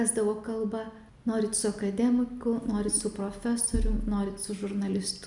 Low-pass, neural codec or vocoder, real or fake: 10.8 kHz; none; real